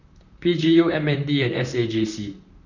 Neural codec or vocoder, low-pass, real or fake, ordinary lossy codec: vocoder, 44.1 kHz, 128 mel bands, Pupu-Vocoder; 7.2 kHz; fake; none